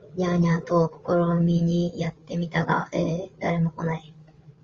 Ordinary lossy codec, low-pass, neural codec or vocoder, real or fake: Opus, 32 kbps; 7.2 kHz; codec, 16 kHz, 8 kbps, FreqCodec, larger model; fake